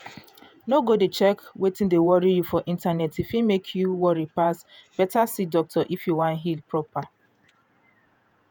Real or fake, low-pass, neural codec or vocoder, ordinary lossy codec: fake; none; vocoder, 48 kHz, 128 mel bands, Vocos; none